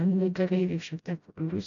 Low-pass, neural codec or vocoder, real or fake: 7.2 kHz; codec, 16 kHz, 0.5 kbps, FreqCodec, smaller model; fake